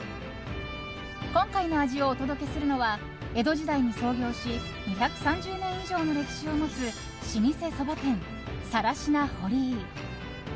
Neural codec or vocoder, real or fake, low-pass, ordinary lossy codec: none; real; none; none